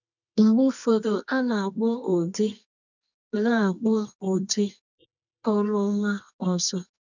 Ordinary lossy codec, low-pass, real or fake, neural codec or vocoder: none; 7.2 kHz; fake; codec, 24 kHz, 0.9 kbps, WavTokenizer, medium music audio release